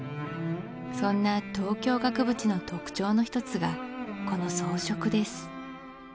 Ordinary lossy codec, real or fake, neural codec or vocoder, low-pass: none; real; none; none